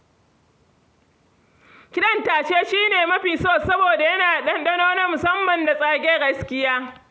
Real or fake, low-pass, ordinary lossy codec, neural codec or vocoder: real; none; none; none